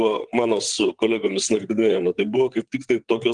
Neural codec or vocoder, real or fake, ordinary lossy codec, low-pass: vocoder, 22.05 kHz, 80 mel bands, WaveNeXt; fake; Opus, 24 kbps; 9.9 kHz